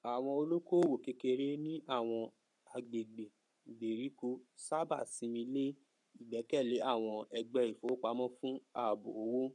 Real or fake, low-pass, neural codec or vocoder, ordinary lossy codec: fake; 10.8 kHz; codec, 44.1 kHz, 7.8 kbps, Pupu-Codec; MP3, 96 kbps